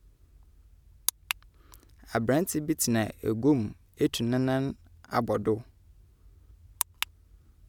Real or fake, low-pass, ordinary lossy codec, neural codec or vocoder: real; 19.8 kHz; none; none